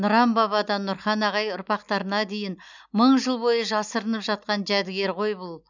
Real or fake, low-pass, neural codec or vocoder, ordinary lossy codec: real; 7.2 kHz; none; none